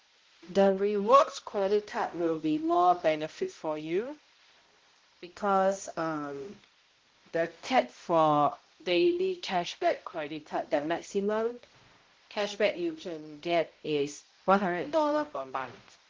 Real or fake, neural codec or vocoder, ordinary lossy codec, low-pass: fake; codec, 16 kHz, 0.5 kbps, X-Codec, HuBERT features, trained on balanced general audio; Opus, 16 kbps; 7.2 kHz